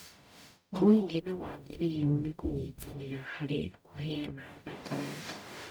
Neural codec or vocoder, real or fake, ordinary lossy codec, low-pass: codec, 44.1 kHz, 0.9 kbps, DAC; fake; none; none